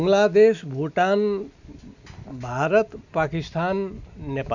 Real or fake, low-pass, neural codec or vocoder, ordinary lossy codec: real; 7.2 kHz; none; none